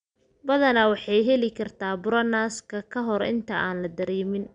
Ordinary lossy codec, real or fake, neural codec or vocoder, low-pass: none; real; none; 9.9 kHz